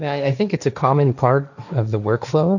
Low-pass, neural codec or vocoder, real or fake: 7.2 kHz; codec, 16 kHz, 1.1 kbps, Voila-Tokenizer; fake